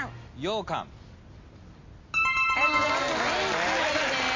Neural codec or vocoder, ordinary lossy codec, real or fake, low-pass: none; MP3, 48 kbps; real; 7.2 kHz